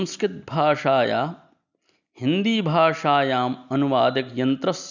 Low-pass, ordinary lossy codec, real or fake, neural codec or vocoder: 7.2 kHz; none; real; none